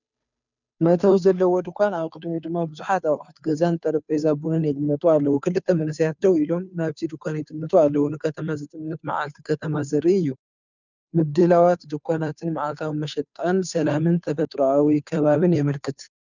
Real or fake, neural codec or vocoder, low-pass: fake; codec, 16 kHz, 2 kbps, FunCodec, trained on Chinese and English, 25 frames a second; 7.2 kHz